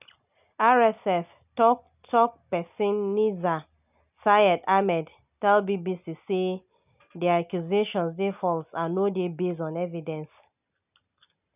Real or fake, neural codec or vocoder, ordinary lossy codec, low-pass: real; none; none; 3.6 kHz